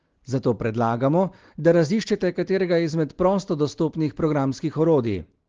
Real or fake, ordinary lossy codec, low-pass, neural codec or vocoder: real; Opus, 16 kbps; 7.2 kHz; none